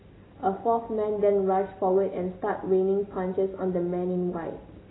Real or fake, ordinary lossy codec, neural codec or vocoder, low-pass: real; AAC, 16 kbps; none; 7.2 kHz